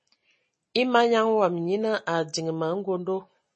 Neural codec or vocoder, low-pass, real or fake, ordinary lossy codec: none; 10.8 kHz; real; MP3, 32 kbps